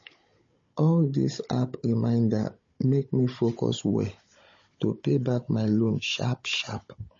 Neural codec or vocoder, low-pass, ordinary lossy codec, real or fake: codec, 16 kHz, 16 kbps, FunCodec, trained on Chinese and English, 50 frames a second; 7.2 kHz; MP3, 32 kbps; fake